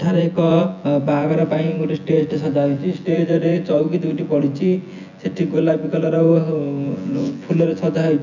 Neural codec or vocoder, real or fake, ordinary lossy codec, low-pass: vocoder, 24 kHz, 100 mel bands, Vocos; fake; none; 7.2 kHz